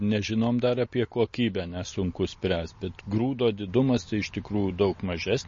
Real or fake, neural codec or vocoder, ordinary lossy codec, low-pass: real; none; MP3, 32 kbps; 7.2 kHz